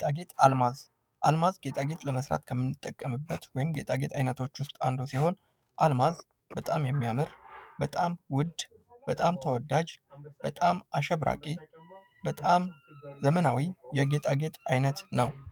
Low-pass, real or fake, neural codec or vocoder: 19.8 kHz; fake; codec, 44.1 kHz, 7.8 kbps, DAC